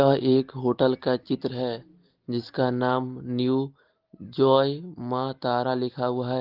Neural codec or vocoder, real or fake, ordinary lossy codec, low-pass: none; real; Opus, 16 kbps; 5.4 kHz